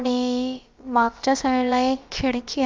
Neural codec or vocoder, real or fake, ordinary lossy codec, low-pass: codec, 16 kHz, about 1 kbps, DyCAST, with the encoder's durations; fake; Opus, 24 kbps; 7.2 kHz